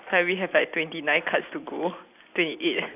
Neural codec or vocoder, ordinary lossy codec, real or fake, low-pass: none; AAC, 32 kbps; real; 3.6 kHz